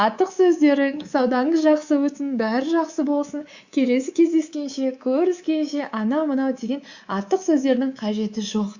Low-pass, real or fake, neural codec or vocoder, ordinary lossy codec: 7.2 kHz; fake; codec, 24 kHz, 3.1 kbps, DualCodec; Opus, 64 kbps